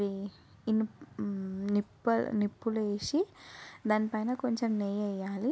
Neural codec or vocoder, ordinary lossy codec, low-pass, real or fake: none; none; none; real